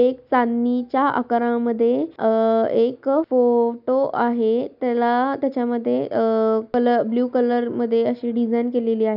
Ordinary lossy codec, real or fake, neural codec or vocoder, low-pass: none; real; none; 5.4 kHz